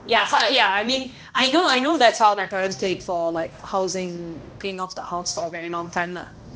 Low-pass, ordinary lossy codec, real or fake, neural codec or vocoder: none; none; fake; codec, 16 kHz, 1 kbps, X-Codec, HuBERT features, trained on balanced general audio